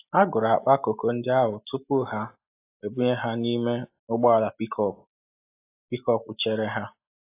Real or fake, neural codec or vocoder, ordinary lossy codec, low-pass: real; none; AAC, 24 kbps; 3.6 kHz